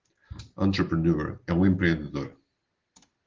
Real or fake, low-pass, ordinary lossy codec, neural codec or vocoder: real; 7.2 kHz; Opus, 16 kbps; none